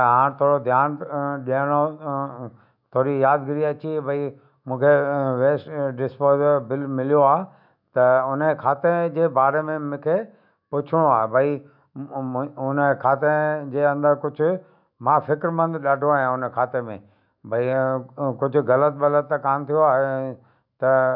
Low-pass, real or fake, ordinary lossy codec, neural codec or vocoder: 5.4 kHz; fake; none; autoencoder, 48 kHz, 128 numbers a frame, DAC-VAE, trained on Japanese speech